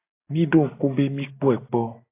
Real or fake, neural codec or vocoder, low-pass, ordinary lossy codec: real; none; 3.6 kHz; AAC, 24 kbps